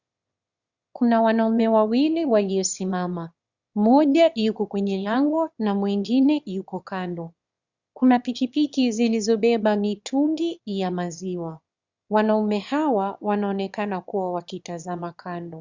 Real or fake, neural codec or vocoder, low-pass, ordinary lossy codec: fake; autoencoder, 22.05 kHz, a latent of 192 numbers a frame, VITS, trained on one speaker; 7.2 kHz; Opus, 64 kbps